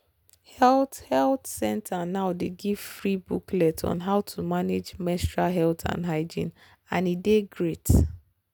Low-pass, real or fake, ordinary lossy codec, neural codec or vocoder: none; real; none; none